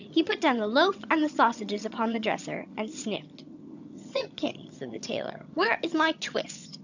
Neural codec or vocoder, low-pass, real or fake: vocoder, 22.05 kHz, 80 mel bands, HiFi-GAN; 7.2 kHz; fake